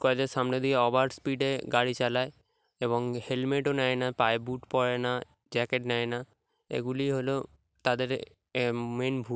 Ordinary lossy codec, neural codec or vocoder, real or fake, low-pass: none; none; real; none